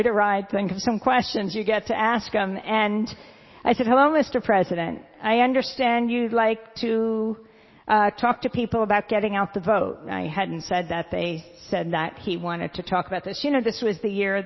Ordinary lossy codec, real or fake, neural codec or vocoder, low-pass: MP3, 24 kbps; real; none; 7.2 kHz